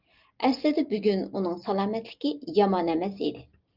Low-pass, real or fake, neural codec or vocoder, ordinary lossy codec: 5.4 kHz; real; none; Opus, 32 kbps